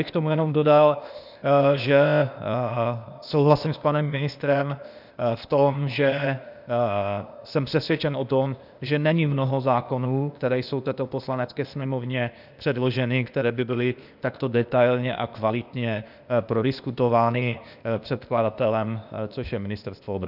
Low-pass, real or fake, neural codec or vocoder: 5.4 kHz; fake; codec, 16 kHz, 0.8 kbps, ZipCodec